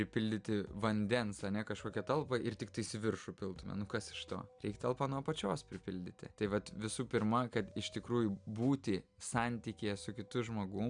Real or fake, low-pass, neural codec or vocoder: real; 9.9 kHz; none